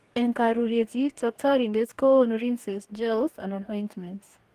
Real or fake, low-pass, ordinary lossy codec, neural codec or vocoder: fake; 14.4 kHz; Opus, 24 kbps; codec, 44.1 kHz, 2.6 kbps, DAC